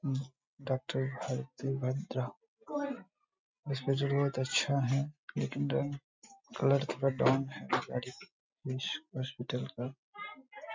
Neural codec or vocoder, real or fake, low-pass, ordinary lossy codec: none; real; 7.2 kHz; MP3, 64 kbps